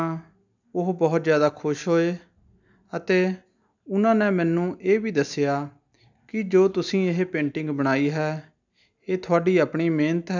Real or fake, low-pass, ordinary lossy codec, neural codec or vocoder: real; 7.2 kHz; none; none